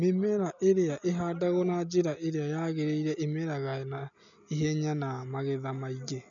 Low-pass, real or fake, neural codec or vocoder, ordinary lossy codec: 7.2 kHz; real; none; none